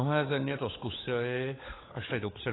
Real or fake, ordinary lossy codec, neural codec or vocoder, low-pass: fake; AAC, 16 kbps; codec, 16 kHz, 16 kbps, FunCodec, trained on LibriTTS, 50 frames a second; 7.2 kHz